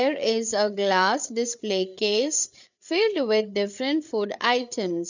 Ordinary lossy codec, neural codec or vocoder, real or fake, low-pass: none; codec, 16 kHz, 8 kbps, FreqCodec, larger model; fake; 7.2 kHz